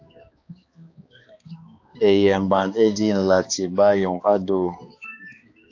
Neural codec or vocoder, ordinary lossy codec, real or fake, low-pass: codec, 16 kHz, 2 kbps, X-Codec, HuBERT features, trained on balanced general audio; AAC, 48 kbps; fake; 7.2 kHz